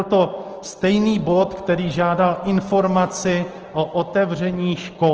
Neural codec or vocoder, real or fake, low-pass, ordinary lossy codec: none; real; 7.2 kHz; Opus, 16 kbps